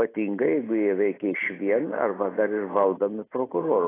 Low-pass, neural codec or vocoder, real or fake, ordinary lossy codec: 3.6 kHz; none; real; AAC, 16 kbps